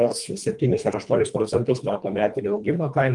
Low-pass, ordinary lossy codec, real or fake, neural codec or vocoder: 10.8 kHz; Opus, 32 kbps; fake; codec, 24 kHz, 1.5 kbps, HILCodec